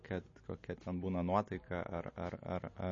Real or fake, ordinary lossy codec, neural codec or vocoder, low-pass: real; MP3, 32 kbps; none; 7.2 kHz